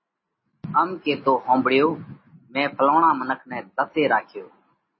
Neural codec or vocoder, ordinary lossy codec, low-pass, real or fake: none; MP3, 24 kbps; 7.2 kHz; real